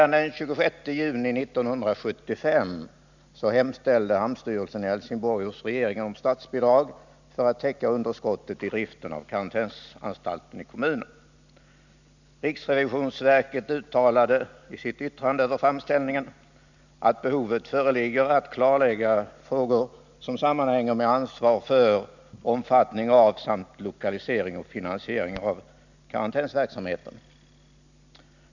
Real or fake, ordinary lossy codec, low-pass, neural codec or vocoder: real; none; 7.2 kHz; none